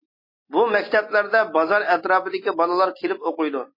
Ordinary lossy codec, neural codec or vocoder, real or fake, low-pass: MP3, 24 kbps; none; real; 5.4 kHz